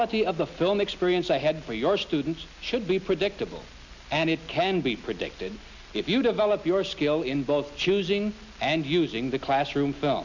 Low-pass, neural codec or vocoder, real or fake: 7.2 kHz; none; real